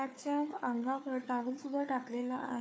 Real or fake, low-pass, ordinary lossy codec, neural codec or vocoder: fake; none; none; codec, 16 kHz, 4 kbps, FunCodec, trained on Chinese and English, 50 frames a second